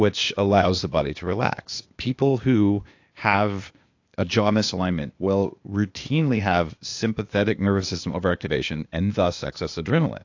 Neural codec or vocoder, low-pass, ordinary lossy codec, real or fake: codec, 16 kHz, 0.8 kbps, ZipCodec; 7.2 kHz; AAC, 48 kbps; fake